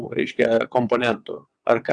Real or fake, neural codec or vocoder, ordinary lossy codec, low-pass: fake; vocoder, 22.05 kHz, 80 mel bands, WaveNeXt; AAC, 64 kbps; 9.9 kHz